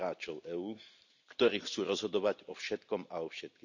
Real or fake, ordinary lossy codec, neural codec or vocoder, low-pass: real; none; none; 7.2 kHz